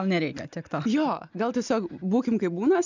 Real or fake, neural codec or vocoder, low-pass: real; none; 7.2 kHz